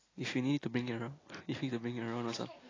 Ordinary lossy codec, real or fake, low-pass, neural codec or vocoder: AAC, 32 kbps; real; 7.2 kHz; none